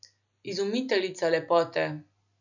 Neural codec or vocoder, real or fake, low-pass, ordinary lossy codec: none; real; 7.2 kHz; none